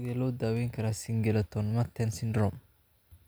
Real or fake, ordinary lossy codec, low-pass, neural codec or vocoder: real; none; none; none